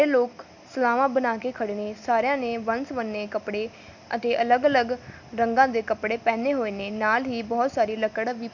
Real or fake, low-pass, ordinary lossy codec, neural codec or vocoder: real; 7.2 kHz; none; none